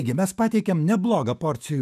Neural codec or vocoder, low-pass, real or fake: autoencoder, 48 kHz, 128 numbers a frame, DAC-VAE, trained on Japanese speech; 14.4 kHz; fake